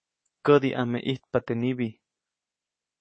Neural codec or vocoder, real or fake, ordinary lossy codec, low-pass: codec, 24 kHz, 3.1 kbps, DualCodec; fake; MP3, 32 kbps; 9.9 kHz